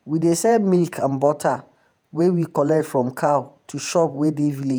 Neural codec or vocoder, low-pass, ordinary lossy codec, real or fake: vocoder, 48 kHz, 128 mel bands, Vocos; none; none; fake